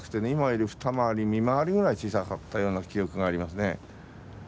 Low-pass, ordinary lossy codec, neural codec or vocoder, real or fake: none; none; none; real